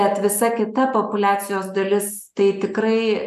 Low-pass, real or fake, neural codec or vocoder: 14.4 kHz; real; none